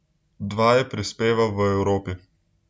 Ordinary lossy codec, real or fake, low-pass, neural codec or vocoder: none; real; none; none